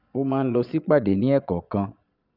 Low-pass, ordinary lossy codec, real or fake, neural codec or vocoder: 5.4 kHz; none; fake; vocoder, 22.05 kHz, 80 mel bands, Vocos